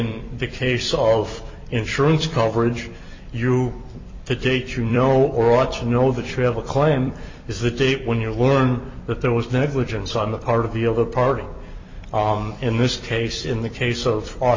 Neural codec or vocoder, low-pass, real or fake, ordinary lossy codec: vocoder, 44.1 kHz, 128 mel bands every 256 samples, BigVGAN v2; 7.2 kHz; fake; MP3, 48 kbps